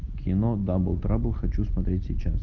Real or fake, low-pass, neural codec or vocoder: real; 7.2 kHz; none